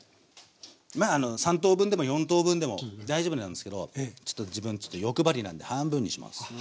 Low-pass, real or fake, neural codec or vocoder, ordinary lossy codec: none; real; none; none